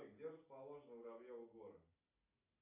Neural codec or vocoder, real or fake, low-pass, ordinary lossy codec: none; real; 3.6 kHz; AAC, 32 kbps